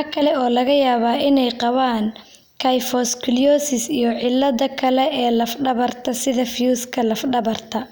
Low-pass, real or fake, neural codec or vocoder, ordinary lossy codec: none; real; none; none